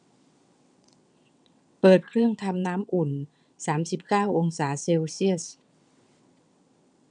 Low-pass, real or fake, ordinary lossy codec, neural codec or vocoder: 9.9 kHz; fake; none; vocoder, 22.05 kHz, 80 mel bands, WaveNeXt